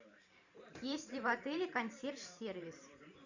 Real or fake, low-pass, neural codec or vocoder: fake; 7.2 kHz; vocoder, 44.1 kHz, 128 mel bands every 512 samples, BigVGAN v2